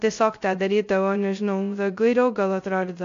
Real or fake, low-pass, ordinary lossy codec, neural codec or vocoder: fake; 7.2 kHz; AAC, 48 kbps; codec, 16 kHz, 0.2 kbps, FocalCodec